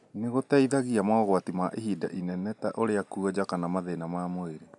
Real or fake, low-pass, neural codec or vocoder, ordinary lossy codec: real; 10.8 kHz; none; none